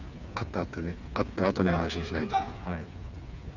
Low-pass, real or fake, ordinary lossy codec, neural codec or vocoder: 7.2 kHz; fake; none; codec, 16 kHz, 4 kbps, FreqCodec, smaller model